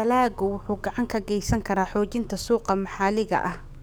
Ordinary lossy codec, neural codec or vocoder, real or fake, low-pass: none; codec, 44.1 kHz, 7.8 kbps, DAC; fake; none